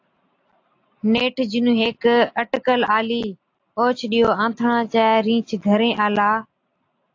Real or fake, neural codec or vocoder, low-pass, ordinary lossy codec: real; none; 7.2 kHz; AAC, 48 kbps